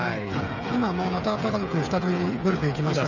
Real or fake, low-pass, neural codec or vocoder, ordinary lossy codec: fake; 7.2 kHz; codec, 16 kHz, 8 kbps, FreqCodec, smaller model; none